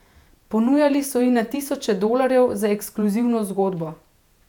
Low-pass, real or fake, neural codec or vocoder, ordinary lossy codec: 19.8 kHz; fake; vocoder, 48 kHz, 128 mel bands, Vocos; none